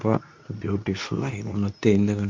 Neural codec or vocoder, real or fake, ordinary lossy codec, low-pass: codec, 24 kHz, 0.9 kbps, WavTokenizer, medium speech release version 2; fake; MP3, 48 kbps; 7.2 kHz